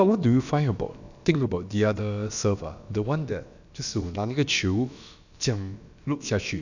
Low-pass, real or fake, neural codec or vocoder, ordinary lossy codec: 7.2 kHz; fake; codec, 16 kHz, about 1 kbps, DyCAST, with the encoder's durations; none